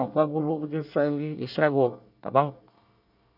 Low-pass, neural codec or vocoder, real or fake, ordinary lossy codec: 5.4 kHz; codec, 24 kHz, 1 kbps, SNAC; fake; none